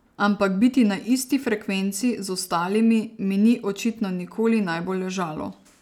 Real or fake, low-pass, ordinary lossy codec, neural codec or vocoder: real; 19.8 kHz; none; none